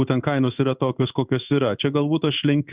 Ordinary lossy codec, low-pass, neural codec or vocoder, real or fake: Opus, 64 kbps; 3.6 kHz; codec, 16 kHz in and 24 kHz out, 1 kbps, XY-Tokenizer; fake